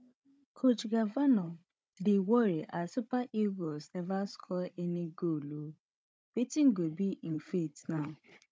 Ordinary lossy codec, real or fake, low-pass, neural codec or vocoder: none; fake; none; codec, 16 kHz, 16 kbps, FunCodec, trained on Chinese and English, 50 frames a second